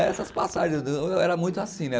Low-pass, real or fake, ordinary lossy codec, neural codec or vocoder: none; real; none; none